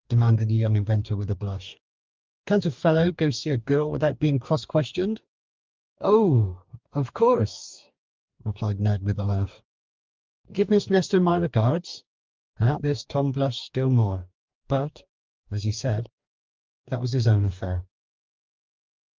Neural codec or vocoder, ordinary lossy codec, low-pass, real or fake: codec, 44.1 kHz, 2.6 kbps, DAC; Opus, 24 kbps; 7.2 kHz; fake